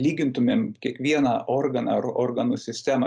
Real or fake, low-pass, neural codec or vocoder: real; 9.9 kHz; none